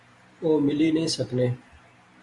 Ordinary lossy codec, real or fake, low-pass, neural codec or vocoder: Opus, 64 kbps; fake; 10.8 kHz; vocoder, 44.1 kHz, 128 mel bands every 512 samples, BigVGAN v2